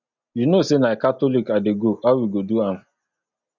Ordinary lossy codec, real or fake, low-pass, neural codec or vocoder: MP3, 64 kbps; real; 7.2 kHz; none